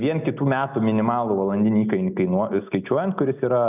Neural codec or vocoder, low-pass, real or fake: none; 3.6 kHz; real